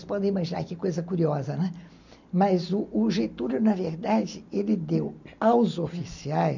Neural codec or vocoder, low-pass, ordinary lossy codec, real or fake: none; 7.2 kHz; none; real